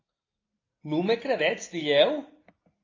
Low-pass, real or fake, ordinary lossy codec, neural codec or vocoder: 7.2 kHz; real; AAC, 32 kbps; none